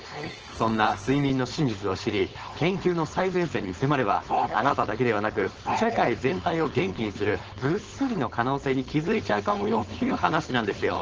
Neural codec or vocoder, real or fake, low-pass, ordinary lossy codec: codec, 16 kHz, 4.8 kbps, FACodec; fake; 7.2 kHz; Opus, 16 kbps